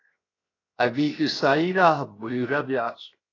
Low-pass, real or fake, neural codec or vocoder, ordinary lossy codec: 7.2 kHz; fake; codec, 16 kHz, 0.7 kbps, FocalCodec; AAC, 32 kbps